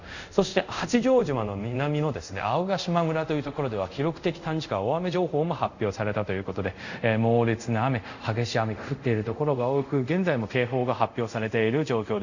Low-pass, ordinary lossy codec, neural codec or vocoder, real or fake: 7.2 kHz; none; codec, 24 kHz, 0.5 kbps, DualCodec; fake